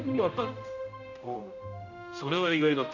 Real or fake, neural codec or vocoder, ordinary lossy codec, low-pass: fake; codec, 16 kHz, 0.5 kbps, X-Codec, HuBERT features, trained on general audio; none; 7.2 kHz